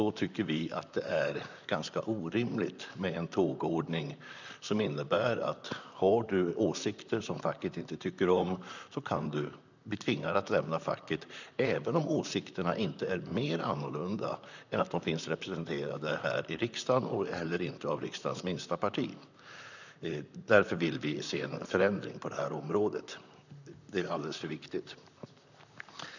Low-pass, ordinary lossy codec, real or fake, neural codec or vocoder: 7.2 kHz; none; fake; vocoder, 44.1 kHz, 128 mel bands, Pupu-Vocoder